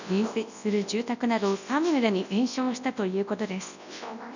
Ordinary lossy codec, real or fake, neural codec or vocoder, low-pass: none; fake; codec, 24 kHz, 0.9 kbps, WavTokenizer, large speech release; 7.2 kHz